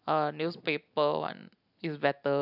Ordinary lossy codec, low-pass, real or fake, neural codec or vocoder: none; 5.4 kHz; real; none